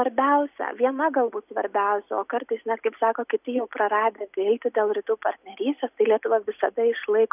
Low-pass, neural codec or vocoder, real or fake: 3.6 kHz; none; real